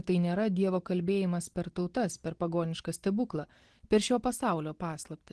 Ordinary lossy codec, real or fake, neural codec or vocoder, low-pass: Opus, 16 kbps; real; none; 10.8 kHz